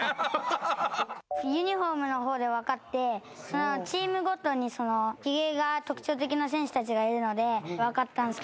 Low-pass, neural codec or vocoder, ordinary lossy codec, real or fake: none; none; none; real